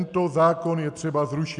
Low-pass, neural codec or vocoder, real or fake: 10.8 kHz; none; real